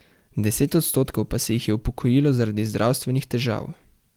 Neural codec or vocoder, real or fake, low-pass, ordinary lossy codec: none; real; 19.8 kHz; Opus, 24 kbps